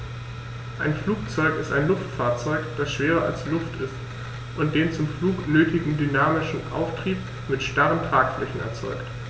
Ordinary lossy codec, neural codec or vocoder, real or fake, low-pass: none; none; real; none